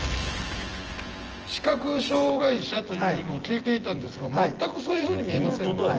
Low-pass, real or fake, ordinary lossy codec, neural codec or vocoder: 7.2 kHz; fake; Opus, 16 kbps; vocoder, 24 kHz, 100 mel bands, Vocos